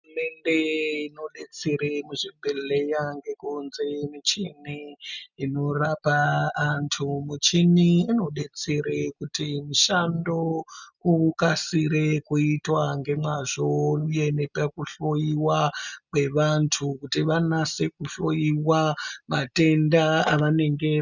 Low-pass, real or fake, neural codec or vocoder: 7.2 kHz; real; none